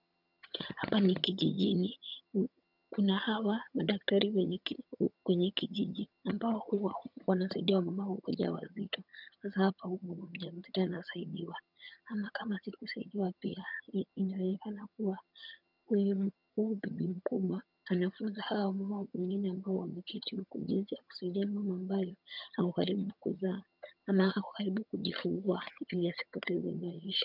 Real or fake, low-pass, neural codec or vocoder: fake; 5.4 kHz; vocoder, 22.05 kHz, 80 mel bands, HiFi-GAN